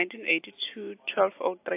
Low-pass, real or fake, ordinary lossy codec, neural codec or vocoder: 3.6 kHz; real; AAC, 24 kbps; none